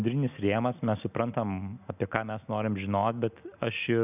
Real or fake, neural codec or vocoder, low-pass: real; none; 3.6 kHz